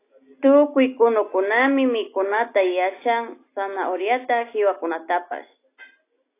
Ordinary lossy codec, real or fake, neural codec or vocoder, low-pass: AAC, 24 kbps; real; none; 3.6 kHz